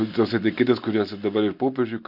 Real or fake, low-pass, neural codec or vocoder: real; 5.4 kHz; none